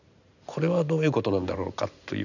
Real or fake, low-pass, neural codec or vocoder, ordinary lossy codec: real; 7.2 kHz; none; none